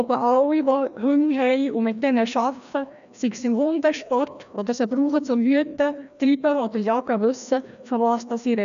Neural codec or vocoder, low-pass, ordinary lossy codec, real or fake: codec, 16 kHz, 1 kbps, FreqCodec, larger model; 7.2 kHz; none; fake